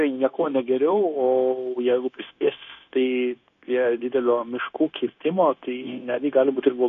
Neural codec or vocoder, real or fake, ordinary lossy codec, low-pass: codec, 16 kHz, 0.9 kbps, LongCat-Audio-Codec; fake; AAC, 48 kbps; 7.2 kHz